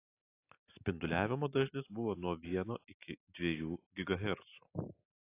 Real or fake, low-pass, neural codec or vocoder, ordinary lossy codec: real; 3.6 kHz; none; AAC, 24 kbps